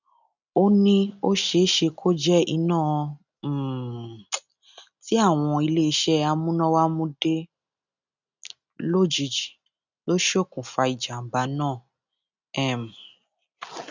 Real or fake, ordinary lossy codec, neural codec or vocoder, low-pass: real; none; none; 7.2 kHz